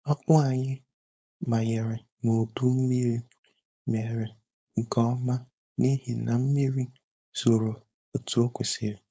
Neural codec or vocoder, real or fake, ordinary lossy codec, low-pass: codec, 16 kHz, 4.8 kbps, FACodec; fake; none; none